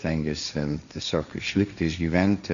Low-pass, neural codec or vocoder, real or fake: 7.2 kHz; codec, 16 kHz, 1.1 kbps, Voila-Tokenizer; fake